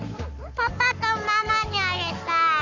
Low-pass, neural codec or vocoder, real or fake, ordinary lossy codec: 7.2 kHz; codec, 44.1 kHz, 7.8 kbps, Pupu-Codec; fake; none